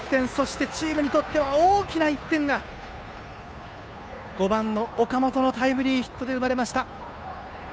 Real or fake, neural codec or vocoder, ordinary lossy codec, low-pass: fake; codec, 16 kHz, 2 kbps, FunCodec, trained on Chinese and English, 25 frames a second; none; none